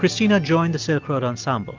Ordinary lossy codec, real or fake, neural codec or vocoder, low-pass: Opus, 32 kbps; real; none; 7.2 kHz